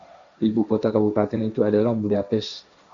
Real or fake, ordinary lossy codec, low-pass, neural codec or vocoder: fake; MP3, 64 kbps; 7.2 kHz; codec, 16 kHz, 1.1 kbps, Voila-Tokenizer